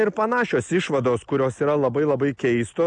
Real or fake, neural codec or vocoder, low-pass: real; none; 9.9 kHz